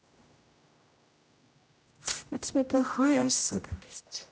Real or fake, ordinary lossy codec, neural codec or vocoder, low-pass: fake; none; codec, 16 kHz, 0.5 kbps, X-Codec, HuBERT features, trained on general audio; none